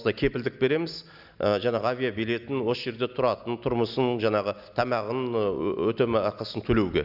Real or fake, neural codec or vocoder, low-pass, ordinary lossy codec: real; none; 5.4 kHz; none